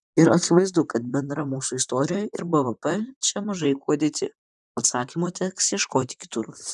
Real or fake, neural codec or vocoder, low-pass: fake; vocoder, 44.1 kHz, 128 mel bands, Pupu-Vocoder; 10.8 kHz